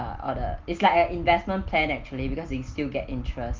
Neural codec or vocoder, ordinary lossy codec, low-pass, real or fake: none; Opus, 32 kbps; 7.2 kHz; real